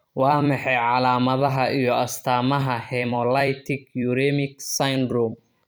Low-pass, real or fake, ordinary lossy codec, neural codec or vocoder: none; fake; none; vocoder, 44.1 kHz, 128 mel bands every 256 samples, BigVGAN v2